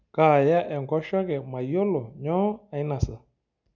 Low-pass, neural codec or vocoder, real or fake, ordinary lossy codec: 7.2 kHz; none; real; none